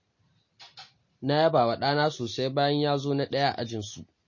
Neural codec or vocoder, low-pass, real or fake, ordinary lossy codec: none; 7.2 kHz; real; MP3, 32 kbps